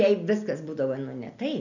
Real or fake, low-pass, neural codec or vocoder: real; 7.2 kHz; none